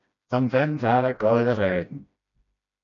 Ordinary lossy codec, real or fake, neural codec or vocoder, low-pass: MP3, 96 kbps; fake; codec, 16 kHz, 1 kbps, FreqCodec, smaller model; 7.2 kHz